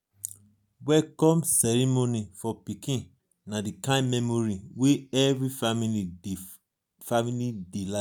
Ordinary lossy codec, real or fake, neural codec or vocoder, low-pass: none; real; none; none